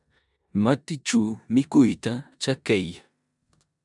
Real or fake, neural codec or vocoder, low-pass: fake; codec, 16 kHz in and 24 kHz out, 0.9 kbps, LongCat-Audio-Codec, four codebook decoder; 10.8 kHz